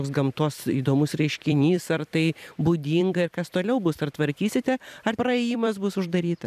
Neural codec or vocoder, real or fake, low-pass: vocoder, 44.1 kHz, 128 mel bands every 256 samples, BigVGAN v2; fake; 14.4 kHz